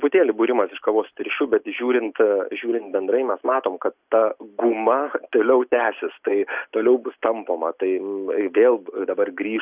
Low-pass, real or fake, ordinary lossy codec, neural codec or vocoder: 3.6 kHz; real; Opus, 64 kbps; none